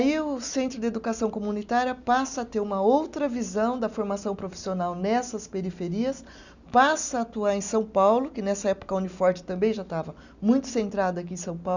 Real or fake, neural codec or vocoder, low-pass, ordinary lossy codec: real; none; 7.2 kHz; none